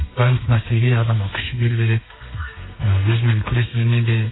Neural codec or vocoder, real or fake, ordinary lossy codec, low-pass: codec, 32 kHz, 1.9 kbps, SNAC; fake; AAC, 16 kbps; 7.2 kHz